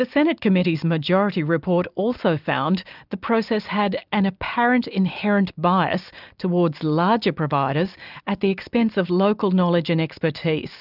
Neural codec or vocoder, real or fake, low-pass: none; real; 5.4 kHz